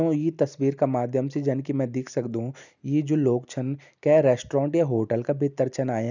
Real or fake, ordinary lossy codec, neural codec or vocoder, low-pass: real; none; none; 7.2 kHz